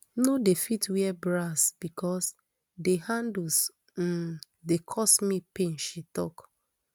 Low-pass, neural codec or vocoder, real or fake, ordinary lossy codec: none; none; real; none